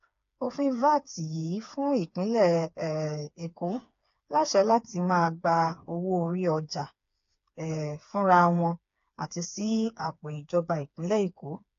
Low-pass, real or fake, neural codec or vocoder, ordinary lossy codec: 7.2 kHz; fake; codec, 16 kHz, 4 kbps, FreqCodec, smaller model; AAC, 48 kbps